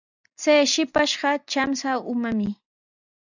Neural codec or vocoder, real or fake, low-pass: none; real; 7.2 kHz